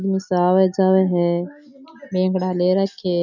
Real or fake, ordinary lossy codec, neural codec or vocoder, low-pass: real; none; none; 7.2 kHz